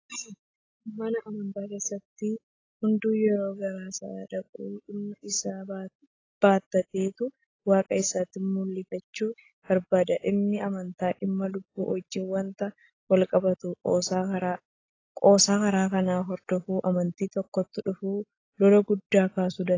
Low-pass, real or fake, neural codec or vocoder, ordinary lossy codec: 7.2 kHz; real; none; AAC, 32 kbps